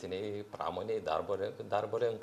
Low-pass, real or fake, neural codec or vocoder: 14.4 kHz; real; none